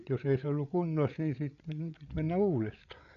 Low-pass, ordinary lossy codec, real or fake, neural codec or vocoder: 7.2 kHz; none; fake; codec, 16 kHz, 8 kbps, FreqCodec, larger model